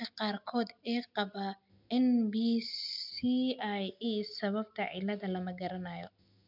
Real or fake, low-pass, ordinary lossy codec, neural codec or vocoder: real; 5.4 kHz; MP3, 48 kbps; none